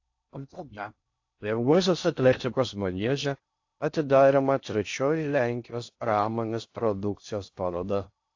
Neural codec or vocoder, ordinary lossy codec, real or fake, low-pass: codec, 16 kHz in and 24 kHz out, 0.6 kbps, FocalCodec, streaming, 4096 codes; AAC, 48 kbps; fake; 7.2 kHz